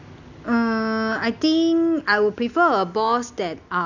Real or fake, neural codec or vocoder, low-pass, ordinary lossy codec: real; none; 7.2 kHz; none